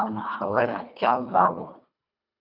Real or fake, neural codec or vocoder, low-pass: fake; codec, 24 kHz, 1.5 kbps, HILCodec; 5.4 kHz